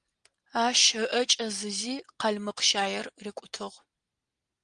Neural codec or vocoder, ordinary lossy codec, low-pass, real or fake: none; Opus, 24 kbps; 9.9 kHz; real